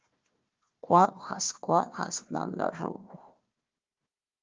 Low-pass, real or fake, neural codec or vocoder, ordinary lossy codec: 7.2 kHz; fake; codec, 16 kHz, 1 kbps, FunCodec, trained on Chinese and English, 50 frames a second; Opus, 32 kbps